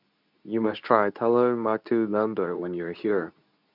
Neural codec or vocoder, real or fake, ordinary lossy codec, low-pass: codec, 24 kHz, 0.9 kbps, WavTokenizer, medium speech release version 2; fake; none; 5.4 kHz